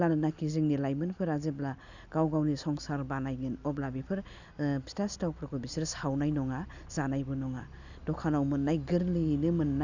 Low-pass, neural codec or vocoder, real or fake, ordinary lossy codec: 7.2 kHz; none; real; none